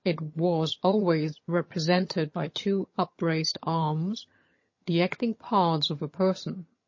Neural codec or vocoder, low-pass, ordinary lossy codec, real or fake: vocoder, 22.05 kHz, 80 mel bands, HiFi-GAN; 7.2 kHz; MP3, 32 kbps; fake